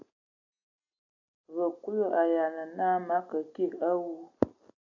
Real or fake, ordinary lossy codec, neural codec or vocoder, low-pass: real; AAC, 48 kbps; none; 7.2 kHz